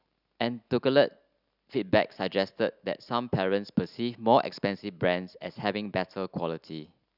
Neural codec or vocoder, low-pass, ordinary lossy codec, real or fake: none; 5.4 kHz; none; real